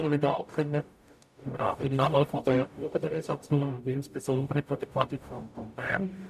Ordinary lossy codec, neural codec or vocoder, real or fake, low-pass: none; codec, 44.1 kHz, 0.9 kbps, DAC; fake; 14.4 kHz